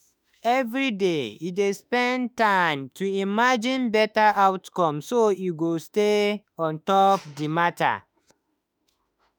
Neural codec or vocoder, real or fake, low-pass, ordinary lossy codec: autoencoder, 48 kHz, 32 numbers a frame, DAC-VAE, trained on Japanese speech; fake; none; none